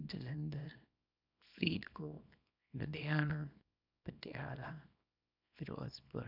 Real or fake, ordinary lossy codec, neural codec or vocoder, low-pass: fake; none; codec, 24 kHz, 0.9 kbps, WavTokenizer, small release; 5.4 kHz